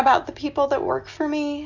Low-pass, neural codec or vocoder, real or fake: 7.2 kHz; none; real